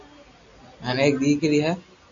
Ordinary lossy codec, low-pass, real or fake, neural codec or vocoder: AAC, 64 kbps; 7.2 kHz; real; none